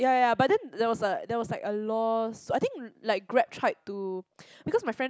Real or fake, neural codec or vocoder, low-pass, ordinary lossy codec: real; none; none; none